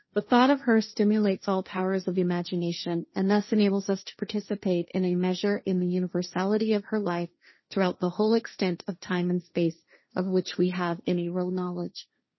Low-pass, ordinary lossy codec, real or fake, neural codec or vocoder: 7.2 kHz; MP3, 24 kbps; fake; codec, 16 kHz, 1.1 kbps, Voila-Tokenizer